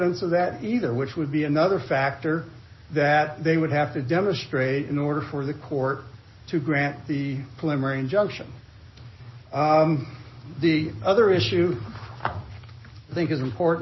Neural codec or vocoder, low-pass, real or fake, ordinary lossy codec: none; 7.2 kHz; real; MP3, 24 kbps